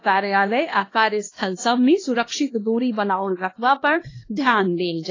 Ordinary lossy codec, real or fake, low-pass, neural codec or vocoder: AAC, 32 kbps; fake; 7.2 kHz; codec, 16 kHz, 1 kbps, X-Codec, HuBERT features, trained on LibriSpeech